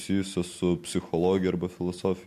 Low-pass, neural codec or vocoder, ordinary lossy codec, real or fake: 14.4 kHz; none; MP3, 64 kbps; real